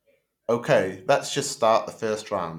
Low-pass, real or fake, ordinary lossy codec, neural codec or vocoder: 19.8 kHz; real; none; none